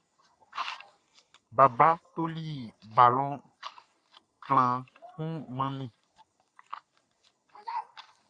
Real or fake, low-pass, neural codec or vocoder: fake; 9.9 kHz; codec, 44.1 kHz, 3.4 kbps, Pupu-Codec